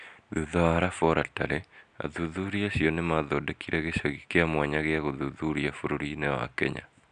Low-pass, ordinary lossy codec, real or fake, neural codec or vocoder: 9.9 kHz; none; real; none